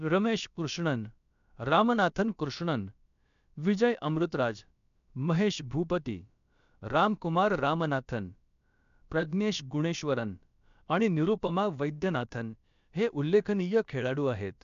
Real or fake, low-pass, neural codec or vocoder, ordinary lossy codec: fake; 7.2 kHz; codec, 16 kHz, about 1 kbps, DyCAST, with the encoder's durations; MP3, 96 kbps